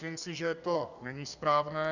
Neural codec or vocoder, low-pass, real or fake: codec, 32 kHz, 1.9 kbps, SNAC; 7.2 kHz; fake